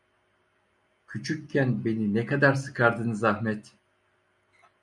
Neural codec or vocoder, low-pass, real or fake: none; 10.8 kHz; real